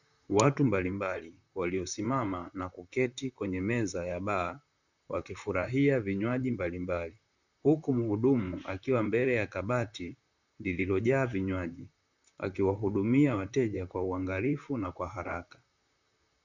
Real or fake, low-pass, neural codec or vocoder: fake; 7.2 kHz; vocoder, 44.1 kHz, 128 mel bands, Pupu-Vocoder